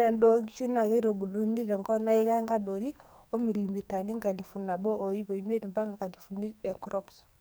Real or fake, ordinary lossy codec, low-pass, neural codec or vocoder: fake; none; none; codec, 44.1 kHz, 2.6 kbps, SNAC